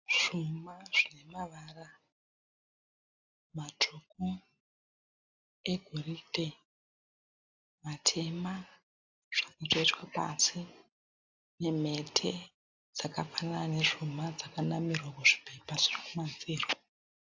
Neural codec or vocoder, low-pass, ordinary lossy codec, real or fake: none; 7.2 kHz; AAC, 48 kbps; real